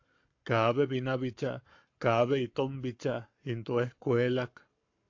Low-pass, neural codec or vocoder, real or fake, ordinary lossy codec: 7.2 kHz; codec, 44.1 kHz, 7.8 kbps, Pupu-Codec; fake; AAC, 48 kbps